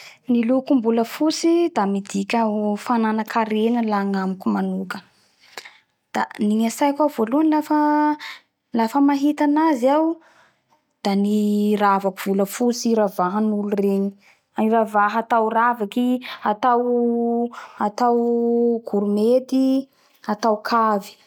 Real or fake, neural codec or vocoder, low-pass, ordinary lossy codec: real; none; 19.8 kHz; none